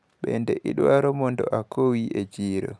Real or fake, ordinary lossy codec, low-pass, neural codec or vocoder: real; none; none; none